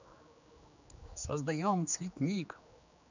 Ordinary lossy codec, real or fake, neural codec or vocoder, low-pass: none; fake; codec, 16 kHz, 2 kbps, X-Codec, HuBERT features, trained on general audio; 7.2 kHz